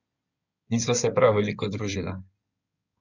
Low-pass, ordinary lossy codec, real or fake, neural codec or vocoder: 7.2 kHz; none; fake; codec, 16 kHz in and 24 kHz out, 2.2 kbps, FireRedTTS-2 codec